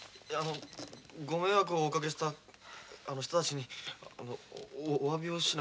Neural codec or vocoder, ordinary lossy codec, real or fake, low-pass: none; none; real; none